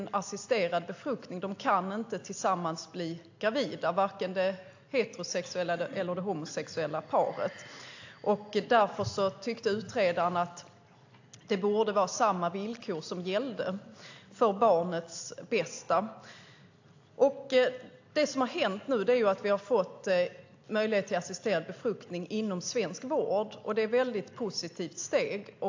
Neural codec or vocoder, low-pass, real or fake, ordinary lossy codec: none; 7.2 kHz; real; AAC, 48 kbps